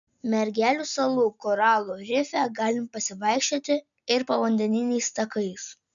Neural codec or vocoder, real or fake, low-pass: none; real; 7.2 kHz